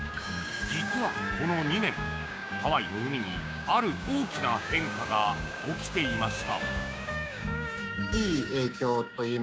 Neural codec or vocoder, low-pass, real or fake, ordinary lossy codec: codec, 16 kHz, 6 kbps, DAC; none; fake; none